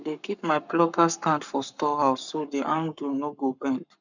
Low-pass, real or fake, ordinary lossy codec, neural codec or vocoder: 7.2 kHz; fake; none; codec, 44.1 kHz, 7.8 kbps, Pupu-Codec